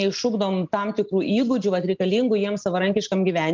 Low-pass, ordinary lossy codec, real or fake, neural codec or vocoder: 7.2 kHz; Opus, 24 kbps; real; none